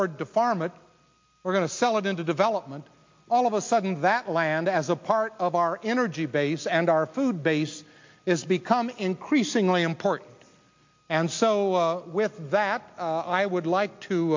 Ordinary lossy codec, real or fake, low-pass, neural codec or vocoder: MP3, 48 kbps; real; 7.2 kHz; none